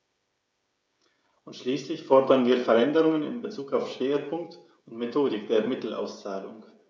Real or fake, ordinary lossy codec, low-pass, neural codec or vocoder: fake; none; none; codec, 16 kHz, 16 kbps, FreqCodec, smaller model